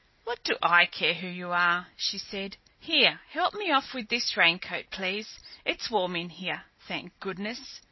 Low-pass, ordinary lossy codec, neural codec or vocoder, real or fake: 7.2 kHz; MP3, 24 kbps; none; real